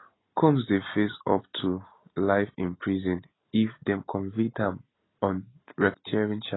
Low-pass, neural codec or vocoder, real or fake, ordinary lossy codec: 7.2 kHz; none; real; AAC, 16 kbps